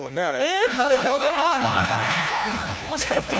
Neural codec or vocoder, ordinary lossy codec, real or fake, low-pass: codec, 16 kHz, 1 kbps, FunCodec, trained on LibriTTS, 50 frames a second; none; fake; none